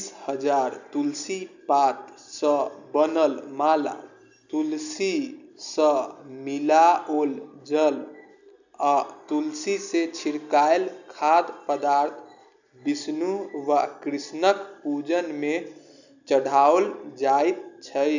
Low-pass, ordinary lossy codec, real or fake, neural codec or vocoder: 7.2 kHz; none; real; none